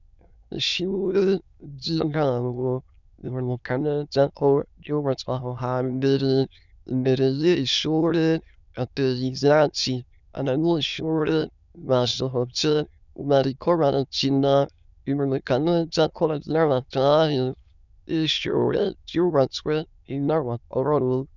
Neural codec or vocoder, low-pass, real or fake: autoencoder, 22.05 kHz, a latent of 192 numbers a frame, VITS, trained on many speakers; 7.2 kHz; fake